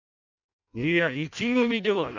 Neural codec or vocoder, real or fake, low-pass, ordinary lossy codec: codec, 16 kHz in and 24 kHz out, 0.6 kbps, FireRedTTS-2 codec; fake; 7.2 kHz; none